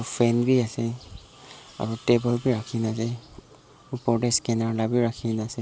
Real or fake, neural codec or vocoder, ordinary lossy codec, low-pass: real; none; none; none